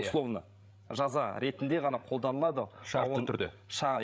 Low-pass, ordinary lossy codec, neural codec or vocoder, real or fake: none; none; codec, 16 kHz, 16 kbps, FreqCodec, larger model; fake